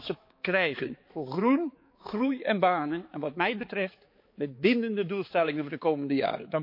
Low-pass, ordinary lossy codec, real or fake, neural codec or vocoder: 5.4 kHz; MP3, 32 kbps; fake; codec, 16 kHz, 4 kbps, X-Codec, HuBERT features, trained on balanced general audio